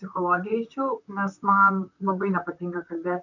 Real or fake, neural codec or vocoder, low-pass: fake; vocoder, 44.1 kHz, 128 mel bands, Pupu-Vocoder; 7.2 kHz